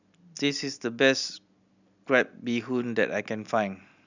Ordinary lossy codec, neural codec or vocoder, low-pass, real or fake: none; none; 7.2 kHz; real